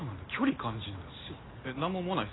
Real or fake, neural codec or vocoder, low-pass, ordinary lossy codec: real; none; 7.2 kHz; AAC, 16 kbps